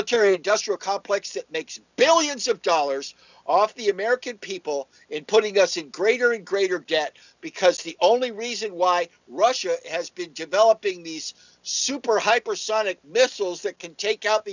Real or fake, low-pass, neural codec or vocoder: real; 7.2 kHz; none